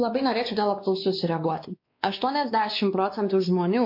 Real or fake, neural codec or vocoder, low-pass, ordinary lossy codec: fake; codec, 16 kHz, 2 kbps, X-Codec, WavLM features, trained on Multilingual LibriSpeech; 5.4 kHz; MP3, 32 kbps